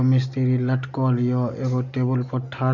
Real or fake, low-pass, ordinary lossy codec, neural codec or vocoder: real; 7.2 kHz; none; none